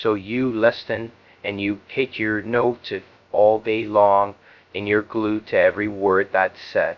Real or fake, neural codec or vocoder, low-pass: fake; codec, 16 kHz, 0.2 kbps, FocalCodec; 7.2 kHz